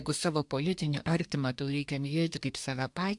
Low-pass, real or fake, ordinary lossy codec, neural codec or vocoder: 10.8 kHz; fake; AAC, 64 kbps; codec, 24 kHz, 1 kbps, SNAC